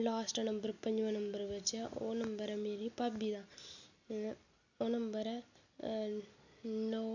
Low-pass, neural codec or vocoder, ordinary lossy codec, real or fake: 7.2 kHz; none; none; real